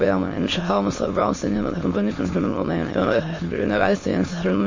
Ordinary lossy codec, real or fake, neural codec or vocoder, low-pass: MP3, 32 kbps; fake; autoencoder, 22.05 kHz, a latent of 192 numbers a frame, VITS, trained on many speakers; 7.2 kHz